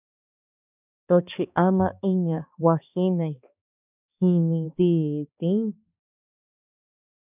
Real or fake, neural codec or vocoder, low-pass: fake; codec, 16 kHz, 2 kbps, X-Codec, HuBERT features, trained on balanced general audio; 3.6 kHz